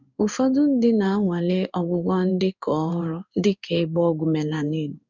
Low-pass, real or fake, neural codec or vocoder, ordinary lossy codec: 7.2 kHz; fake; codec, 16 kHz in and 24 kHz out, 1 kbps, XY-Tokenizer; none